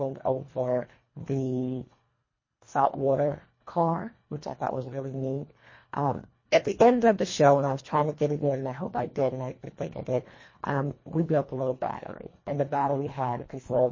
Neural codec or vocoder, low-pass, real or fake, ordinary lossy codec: codec, 24 kHz, 1.5 kbps, HILCodec; 7.2 kHz; fake; MP3, 32 kbps